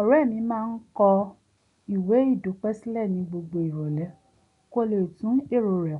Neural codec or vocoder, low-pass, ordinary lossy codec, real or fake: none; 10.8 kHz; none; real